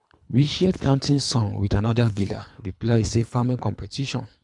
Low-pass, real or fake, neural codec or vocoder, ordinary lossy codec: 10.8 kHz; fake; codec, 24 kHz, 3 kbps, HILCodec; none